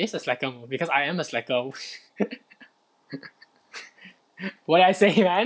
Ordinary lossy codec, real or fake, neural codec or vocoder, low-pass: none; real; none; none